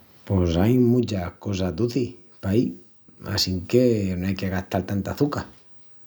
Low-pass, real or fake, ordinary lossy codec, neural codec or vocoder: none; real; none; none